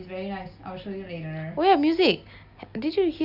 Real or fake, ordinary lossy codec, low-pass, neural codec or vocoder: real; none; 5.4 kHz; none